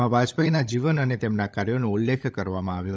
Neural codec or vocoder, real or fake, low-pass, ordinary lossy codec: codec, 16 kHz, 16 kbps, FunCodec, trained on LibriTTS, 50 frames a second; fake; none; none